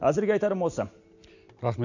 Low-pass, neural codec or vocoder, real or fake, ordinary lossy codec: 7.2 kHz; none; real; none